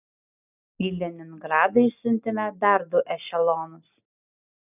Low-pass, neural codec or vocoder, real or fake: 3.6 kHz; none; real